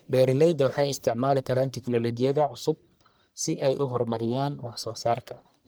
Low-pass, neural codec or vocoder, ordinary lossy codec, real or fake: none; codec, 44.1 kHz, 1.7 kbps, Pupu-Codec; none; fake